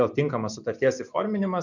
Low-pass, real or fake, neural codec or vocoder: 7.2 kHz; real; none